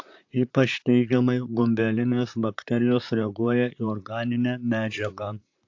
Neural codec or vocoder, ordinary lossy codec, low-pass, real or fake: vocoder, 44.1 kHz, 128 mel bands, Pupu-Vocoder; AAC, 48 kbps; 7.2 kHz; fake